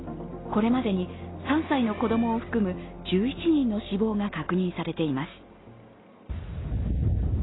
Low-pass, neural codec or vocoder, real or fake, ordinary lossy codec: 7.2 kHz; none; real; AAC, 16 kbps